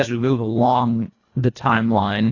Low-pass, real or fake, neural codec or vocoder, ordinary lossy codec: 7.2 kHz; fake; codec, 24 kHz, 1.5 kbps, HILCodec; AAC, 32 kbps